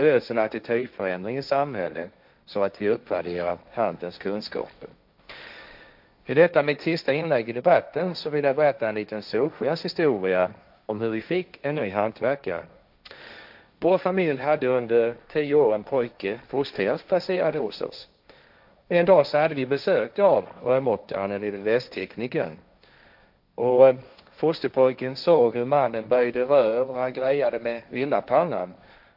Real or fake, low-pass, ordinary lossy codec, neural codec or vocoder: fake; 5.4 kHz; AAC, 48 kbps; codec, 16 kHz, 1.1 kbps, Voila-Tokenizer